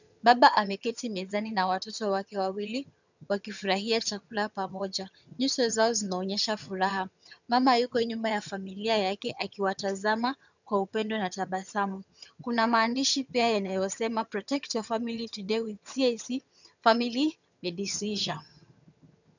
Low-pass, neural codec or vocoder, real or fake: 7.2 kHz; vocoder, 22.05 kHz, 80 mel bands, HiFi-GAN; fake